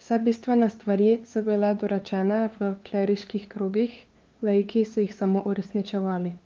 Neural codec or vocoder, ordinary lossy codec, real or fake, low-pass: codec, 16 kHz, 2 kbps, FunCodec, trained on LibriTTS, 25 frames a second; Opus, 24 kbps; fake; 7.2 kHz